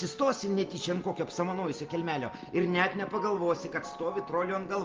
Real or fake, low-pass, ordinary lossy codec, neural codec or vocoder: real; 7.2 kHz; Opus, 32 kbps; none